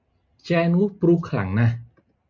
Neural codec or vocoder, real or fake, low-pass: none; real; 7.2 kHz